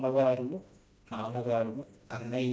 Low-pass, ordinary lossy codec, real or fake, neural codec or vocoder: none; none; fake; codec, 16 kHz, 1 kbps, FreqCodec, smaller model